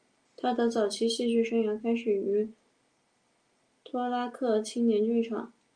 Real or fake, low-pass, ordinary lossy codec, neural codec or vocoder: real; 9.9 kHz; Opus, 32 kbps; none